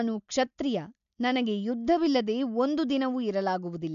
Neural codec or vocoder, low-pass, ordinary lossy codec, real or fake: none; 7.2 kHz; AAC, 96 kbps; real